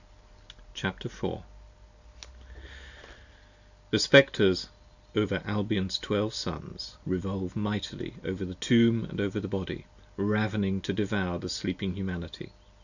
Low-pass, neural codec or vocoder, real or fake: 7.2 kHz; none; real